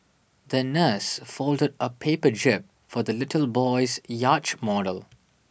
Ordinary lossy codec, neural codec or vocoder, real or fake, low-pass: none; none; real; none